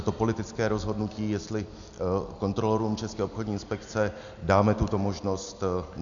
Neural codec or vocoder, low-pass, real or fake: none; 7.2 kHz; real